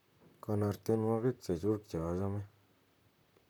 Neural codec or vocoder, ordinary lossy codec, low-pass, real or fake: vocoder, 44.1 kHz, 128 mel bands, Pupu-Vocoder; none; none; fake